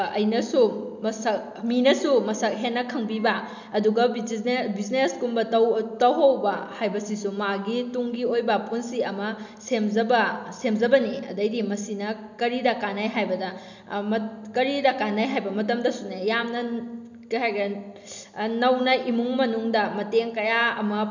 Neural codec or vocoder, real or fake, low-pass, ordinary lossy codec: none; real; 7.2 kHz; none